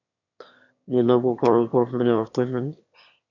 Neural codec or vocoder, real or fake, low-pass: autoencoder, 22.05 kHz, a latent of 192 numbers a frame, VITS, trained on one speaker; fake; 7.2 kHz